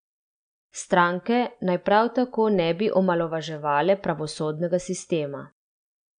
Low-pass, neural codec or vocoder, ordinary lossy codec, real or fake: 9.9 kHz; none; none; real